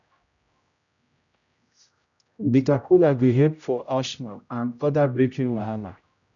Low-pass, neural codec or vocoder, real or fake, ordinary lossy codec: 7.2 kHz; codec, 16 kHz, 0.5 kbps, X-Codec, HuBERT features, trained on general audio; fake; none